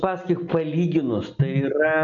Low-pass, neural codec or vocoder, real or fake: 7.2 kHz; none; real